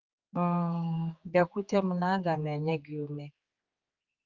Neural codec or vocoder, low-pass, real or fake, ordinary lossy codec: codec, 16 kHz, 4 kbps, X-Codec, HuBERT features, trained on general audio; 7.2 kHz; fake; Opus, 32 kbps